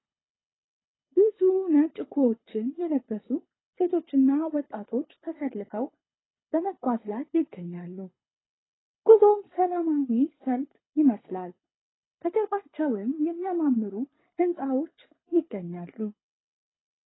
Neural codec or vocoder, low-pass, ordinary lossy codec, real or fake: codec, 24 kHz, 6 kbps, HILCodec; 7.2 kHz; AAC, 16 kbps; fake